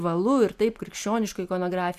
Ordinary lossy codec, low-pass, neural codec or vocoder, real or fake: AAC, 96 kbps; 14.4 kHz; none; real